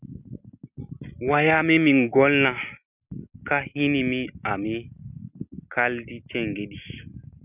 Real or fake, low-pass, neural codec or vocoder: real; 3.6 kHz; none